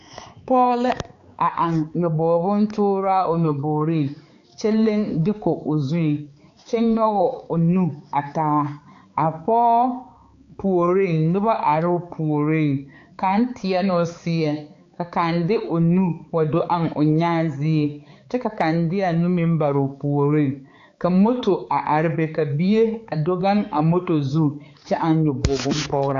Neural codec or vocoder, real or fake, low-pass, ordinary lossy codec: codec, 16 kHz, 4 kbps, X-Codec, HuBERT features, trained on balanced general audio; fake; 7.2 kHz; AAC, 48 kbps